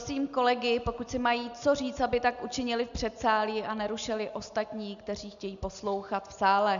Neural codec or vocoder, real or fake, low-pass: none; real; 7.2 kHz